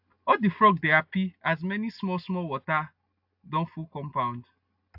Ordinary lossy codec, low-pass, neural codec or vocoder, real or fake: AAC, 48 kbps; 5.4 kHz; none; real